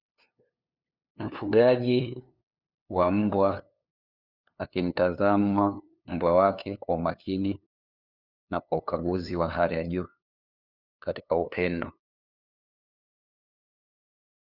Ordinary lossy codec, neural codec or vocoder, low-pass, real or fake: Opus, 64 kbps; codec, 16 kHz, 2 kbps, FunCodec, trained on LibriTTS, 25 frames a second; 5.4 kHz; fake